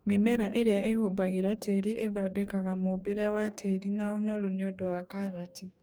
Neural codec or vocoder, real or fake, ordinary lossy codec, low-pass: codec, 44.1 kHz, 2.6 kbps, DAC; fake; none; none